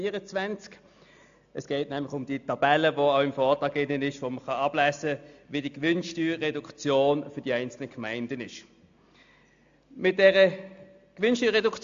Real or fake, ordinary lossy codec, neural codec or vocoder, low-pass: real; AAC, 64 kbps; none; 7.2 kHz